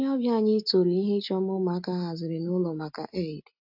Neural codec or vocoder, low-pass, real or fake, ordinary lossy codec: none; 5.4 kHz; real; AAC, 48 kbps